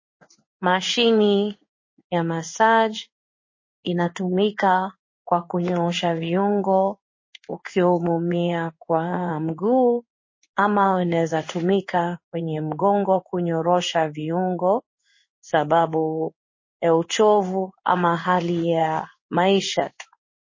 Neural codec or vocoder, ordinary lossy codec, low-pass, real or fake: codec, 16 kHz in and 24 kHz out, 1 kbps, XY-Tokenizer; MP3, 32 kbps; 7.2 kHz; fake